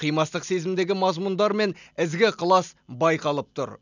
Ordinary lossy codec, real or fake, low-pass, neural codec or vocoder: none; real; 7.2 kHz; none